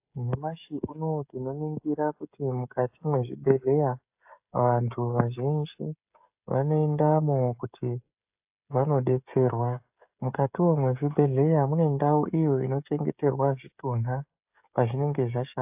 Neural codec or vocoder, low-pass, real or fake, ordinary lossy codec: codec, 16 kHz, 16 kbps, FreqCodec, smaller model; 3.6 kHz; fake; AAC, 32 kbps